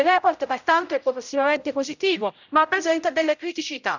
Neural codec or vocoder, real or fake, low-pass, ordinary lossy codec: codec, 16 kHz, 0.5 kbps, X-Codec, HuBERT features, trained on general audio; fake; 7.2 kHz; none